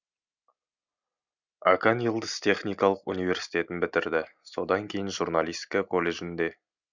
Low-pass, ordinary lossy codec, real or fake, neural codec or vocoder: 7.2 kHz; none; real; none